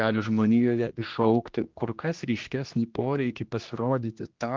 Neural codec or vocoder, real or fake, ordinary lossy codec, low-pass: codec, 16 kHz, 1 kbps, X-Codec, HuBERT features, trained on general audio; fake; Opus, 32 kbps; 7.2 kHz